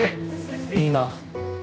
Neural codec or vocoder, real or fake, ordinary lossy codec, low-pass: codec, 16 kHz, 1 kbps, X-Codec, HuBERT features, trained on general audio; fake; none; none